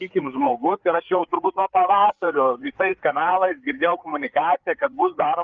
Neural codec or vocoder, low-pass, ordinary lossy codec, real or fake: codec, 16 kHz, 4 kbps, FreqCodec, larger model; 7.2 kHz; Opus, 24 kbps; fake